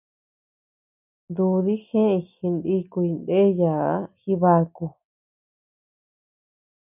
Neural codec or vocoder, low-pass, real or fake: none; 3.6 kHz; real